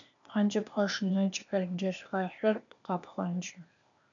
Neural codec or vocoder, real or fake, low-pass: codec, 16 kHz, 0.8 kbps, ZipCodec; fake; 7.2 kHz